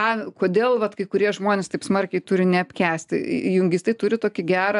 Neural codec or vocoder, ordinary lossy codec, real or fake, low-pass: none; AAC, 96 kbps; real; 10.8 kHz